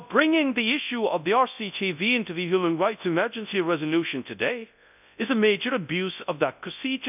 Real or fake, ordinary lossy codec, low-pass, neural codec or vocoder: fake; none; 3.6 kHz; codec, 24 kHz, 0.9 kbps, WavTokenizer, large speech release